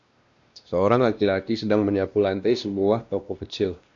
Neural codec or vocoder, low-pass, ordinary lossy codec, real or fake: codec, 16 kHz, 1 kbps, X-Codec, WavLM features, trained on Multilingual LibriSpeech; 7.2 kHz; Opus, 64 kbps; fake